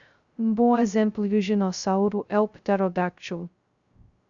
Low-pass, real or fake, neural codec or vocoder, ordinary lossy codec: 7.2 kHz; fake; codec, 16 kHz, 0.2 kbps, FocalCodec; Opus, 64 kbps